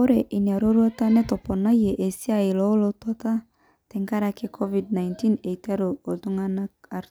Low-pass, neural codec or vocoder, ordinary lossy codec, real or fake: none; none; none; real